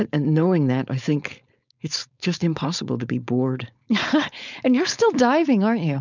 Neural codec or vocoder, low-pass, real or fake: codec, 16 kHz, 4.8 kbps, FACodec; 7.2 kHz; fake